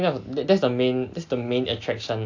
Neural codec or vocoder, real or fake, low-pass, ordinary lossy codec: none; real; 7.2 kHz; AAC, 48 kbps